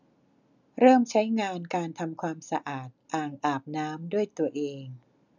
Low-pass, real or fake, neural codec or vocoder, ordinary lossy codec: 7.2 kHz; real; none; none